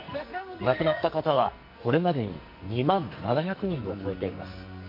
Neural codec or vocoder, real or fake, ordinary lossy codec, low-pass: codec, 44.1 kHz, 2.6 kbps, SNAC; fake; MP3, 32 kbps; 5.4 kHz